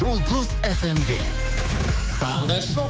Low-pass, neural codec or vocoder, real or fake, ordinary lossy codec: none; codec, 16 kHz, 4 kbps, X-Codec, HuBERT features, trained on general audio; fake; none